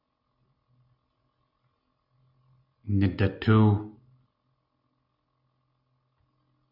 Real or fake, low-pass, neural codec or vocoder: real; 5.4 kHz; none